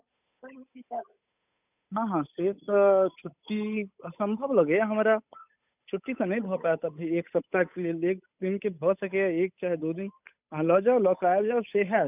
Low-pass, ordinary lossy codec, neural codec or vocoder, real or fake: 3.6 kHz; none; codec, 16 kHz, 8 kbps, FunCodec, trained on Chinese and English, 25 frames a second; fake